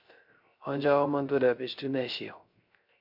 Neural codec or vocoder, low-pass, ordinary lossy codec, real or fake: codec, 16 kHz, 0.3 kbps, FocalCodec; 5.4 kHz; MP3, 48 kbps; fake